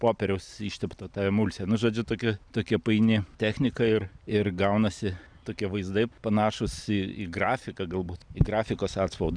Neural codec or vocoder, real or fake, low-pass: none; real; 9.9 kHz